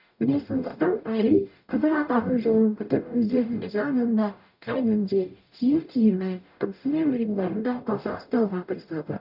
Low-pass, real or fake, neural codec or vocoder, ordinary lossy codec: 5.4 kHz; fake; codec, 44.1 kHz, 0.9 kbps, DAC; none